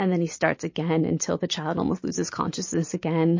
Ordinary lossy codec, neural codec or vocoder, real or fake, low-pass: MP3, 32 kbps; none; real; 7.2 kHz